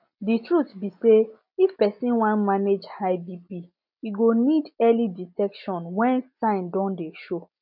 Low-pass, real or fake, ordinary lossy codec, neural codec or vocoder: 5.4 kHz; real; none; none